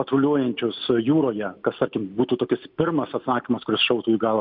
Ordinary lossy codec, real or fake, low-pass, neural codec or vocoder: MP3, 48 kbps; real; 5.4 kHz; none